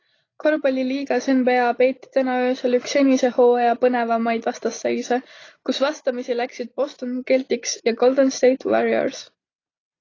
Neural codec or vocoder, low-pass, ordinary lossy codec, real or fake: none; 7.2 kHz; AAC, 32 kbps; real